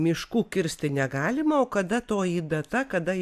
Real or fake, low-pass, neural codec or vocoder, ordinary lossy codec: real; 14.4 kHz; none; AAC, 96 kbps